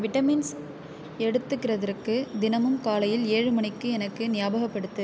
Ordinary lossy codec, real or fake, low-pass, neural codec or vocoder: none; real; none; none